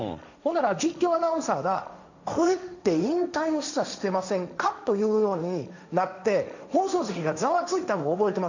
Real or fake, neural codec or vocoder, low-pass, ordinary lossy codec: fake; codec, 16 kHz, 1.1 kbps, Voila-Tokenizer; 7.2 kHz; none